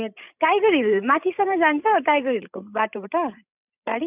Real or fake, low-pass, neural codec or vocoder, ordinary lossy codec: fake; 3.6 kHz; codec, 16 kHz, 16 kbps, FreqCodec, larger model; none